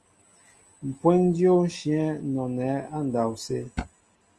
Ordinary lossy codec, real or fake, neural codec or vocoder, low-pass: Opus, 24 kbps; real; none; 10.8 kHz